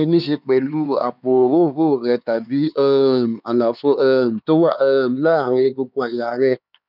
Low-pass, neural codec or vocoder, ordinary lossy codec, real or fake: 5.4 kHz; codec, 16 kHz, 4 kbps, X-Codec, HuBERT features, trained on LibriSpeech; none; fake